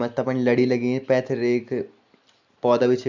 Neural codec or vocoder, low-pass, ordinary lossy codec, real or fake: none; 7.2 kHz; MP3, 64 kbps; real